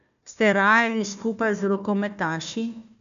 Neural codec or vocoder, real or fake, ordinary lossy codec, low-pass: codec, 16 kHz, 1 kbps, FunCodec, trained on Chinese and English, 50 frames a second; fake; none; 7.2 kHz